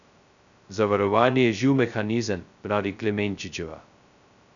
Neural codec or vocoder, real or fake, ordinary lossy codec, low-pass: codec, 16 kHz, 0.2 kbps, FocalCodec; fake; none; 7.2 kHz